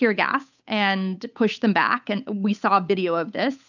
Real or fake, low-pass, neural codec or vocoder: fake; 7.2 kHz; codec, 16 kHz, 8 kbps, FunCodec, trained on Chinese and English, 25 frames a second